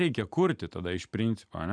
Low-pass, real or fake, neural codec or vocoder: 9.9 kHz; real; none